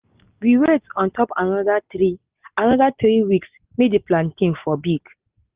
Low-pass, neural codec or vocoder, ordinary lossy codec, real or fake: 3.6 kHz; none; Opus, 32 kbps; real